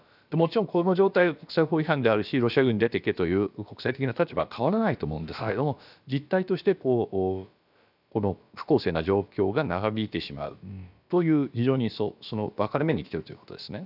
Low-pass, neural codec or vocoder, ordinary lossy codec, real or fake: 5.4 kHz; codec, 16 kHz, about 1 kbps, DyCAST, with the encoder's durations; none; fake